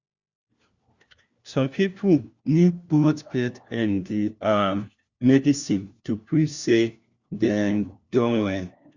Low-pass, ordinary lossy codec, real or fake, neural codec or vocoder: 7.2 kHz; Opus, 64 kbps; fake; codec, 16 kHz, 1 kbps, FunCodec, trained on LibriTTS, 50 frames a second